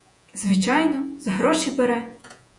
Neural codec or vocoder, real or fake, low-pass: vocoder, 48 kHz, 128 mel bands, Vocos; fake; 10.8 kHz